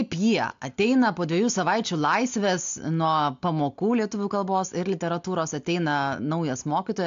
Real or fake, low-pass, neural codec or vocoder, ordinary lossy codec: real; 7.2 kHz; none; AAC, 64 kbps